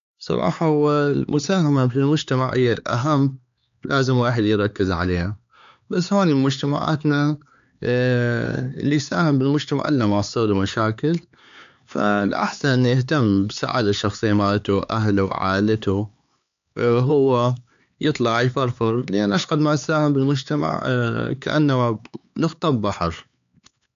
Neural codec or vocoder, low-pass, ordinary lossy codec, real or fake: codec, 16 kHz, 4 kbps, X-Codec, HuBERT features, trained on LibriSpeech; 7.2 kHz; MP3, 64 kbps; fake